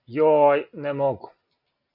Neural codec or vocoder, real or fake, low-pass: none; real; 5.4 kHz